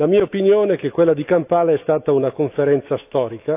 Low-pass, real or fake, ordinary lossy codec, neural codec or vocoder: 3.6 kHz; fake; AAC, 32 kbps; autoencoder, 48 kHz, 128 numbers a frame, DAC-VAE, trained on Japanese speech